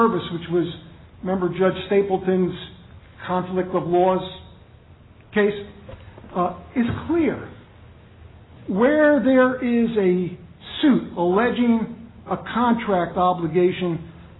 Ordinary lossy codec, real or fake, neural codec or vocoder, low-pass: AAC, 16 kbps; real; none; 7.2 kHz